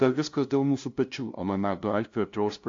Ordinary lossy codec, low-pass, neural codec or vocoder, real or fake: AAC, 48 kbps; 7.2 kHz; codec, 16 kHz, 0.5 kbps, FunCodec, trained on LibriTTS, 25 frames a second; fake